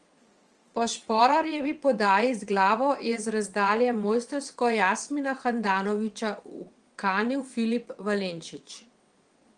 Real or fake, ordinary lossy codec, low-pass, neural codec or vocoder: fake; Opus, 24 kbps; 9.9 kHz; vocoder, 22.05 kHz, 80 mel bands, WaveNeXt